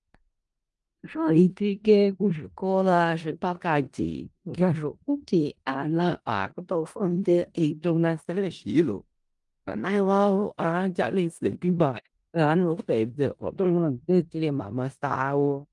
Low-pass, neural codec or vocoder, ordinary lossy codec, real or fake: 10.8 kHz; codec, 16 kHz in and 24 kHz out, 0.4 kbps, LongCat-Audio-Codec, four codebook decoder; Opus, 32 kbps; fake